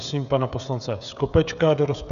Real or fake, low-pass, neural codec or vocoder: fake; 7.2 kHz; codec, 16 kHz, 16 kbps, FreqCodec, smaller model